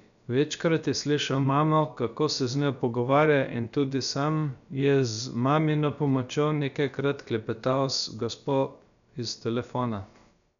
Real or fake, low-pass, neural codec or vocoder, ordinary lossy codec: fake; 7.2 kHz; codec, 16 kHz, about 1 kbps, DyCAST, with the encoder's durations; none